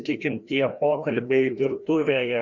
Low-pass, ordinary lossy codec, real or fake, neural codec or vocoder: 7.2 kHz; Opus, 64 kbps; fake; codec, 16 kHz, 1 kbps, FreqCodec, larger model